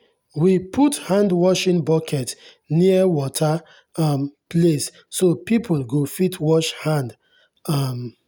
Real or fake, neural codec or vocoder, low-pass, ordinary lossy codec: real; none; none; none